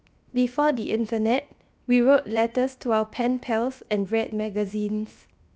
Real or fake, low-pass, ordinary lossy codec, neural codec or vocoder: fake; none; none; codec, 16 kHz, 0.7 kbps, FocalCodec